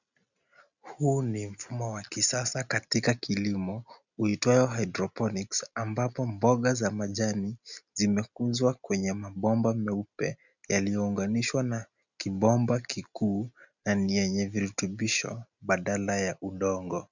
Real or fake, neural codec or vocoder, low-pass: real; none; 7.2 kHz